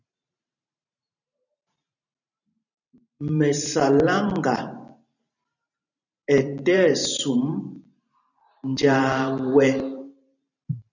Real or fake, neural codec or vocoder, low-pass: fake; vocoder, 44.1 kHz, 128 mel bands every 512 samples, BigVGAN v2; 7.2 kHz